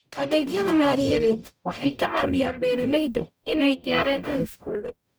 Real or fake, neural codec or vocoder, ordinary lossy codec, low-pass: fake; codec, 44.1 kHz, 0.9 kbps, DAC; none; none